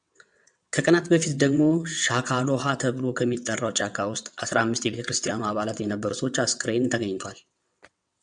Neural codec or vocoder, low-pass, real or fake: vocoder, 22.05 kHz, 80 mel bands, WaveNeXt; 9.9 kHz; fake